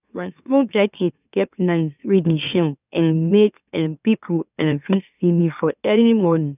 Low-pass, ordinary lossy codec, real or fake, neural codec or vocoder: 3.6 kHz; none; fake; autoencoder, 44.1 kHz, a latent of 192 numbers a frame, MeloTTS